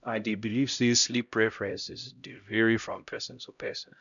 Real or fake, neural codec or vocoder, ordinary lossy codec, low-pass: fake; codec, 16 kHz, 0.5 kbps, X-Codec, HuBERT features, trained on LibriSpeech; none; 7.2 kHz